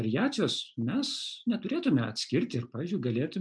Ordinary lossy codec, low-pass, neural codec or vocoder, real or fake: MP3, 96 kbps; 9.9 kHz; none; real